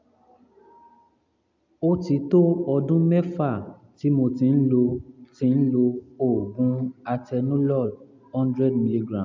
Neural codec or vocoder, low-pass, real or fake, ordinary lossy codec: none; 7.2 kHz; real; MP3, 64 kbps